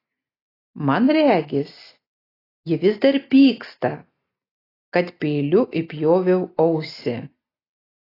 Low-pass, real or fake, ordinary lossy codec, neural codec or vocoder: 5.4 kHz; real; AAC, 24 kbps; none